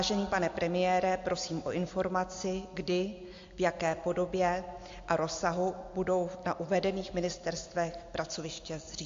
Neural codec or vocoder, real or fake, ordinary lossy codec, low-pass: none; real; AAC, 48 kbps; 7.2 kHz